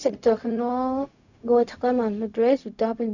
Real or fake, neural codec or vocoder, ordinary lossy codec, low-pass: fake; codec, 16 kHz, 0.4 kbps, LongCat-Audio-Codec; none; 7.2 kHz